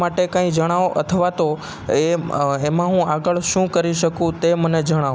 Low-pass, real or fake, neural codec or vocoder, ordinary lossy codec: none; real; none; none